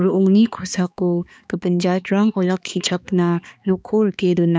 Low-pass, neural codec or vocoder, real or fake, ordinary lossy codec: none; codec, 16 kHz, 2 kbps, X-Codec, HuBERT features, trained on balanced general audio; fake; none